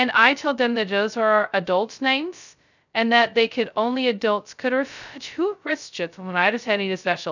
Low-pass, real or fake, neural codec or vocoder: 7.2 kHz; fake; codec, 16 kHz, 0.2 kbps, FocalCodec